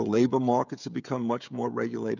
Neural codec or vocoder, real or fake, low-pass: codec, 16 kHz, 16 kbps, FreqCodec, smaller model; fake; 7.2 kHz